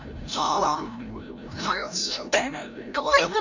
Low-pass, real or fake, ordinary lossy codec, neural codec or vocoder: 7.2 kHz; fake; none; codec, 16 kHz, 0.5 kbps, FreqCodec, larger model